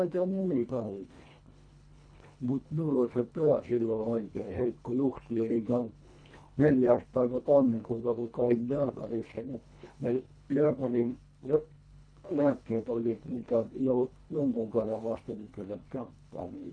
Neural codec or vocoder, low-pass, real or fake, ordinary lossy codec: codec, 24 kHz, 1.5 kbps, HILCodec; 9.9 kHz; fake; none